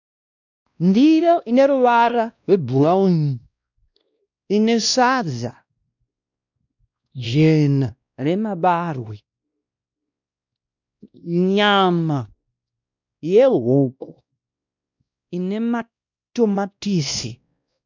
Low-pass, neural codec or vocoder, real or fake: 7.2 kHz; codec, 16 kHz, 1 kbps, X-Codec, WavLM features, trained on Multilingual LibriSpeech; fake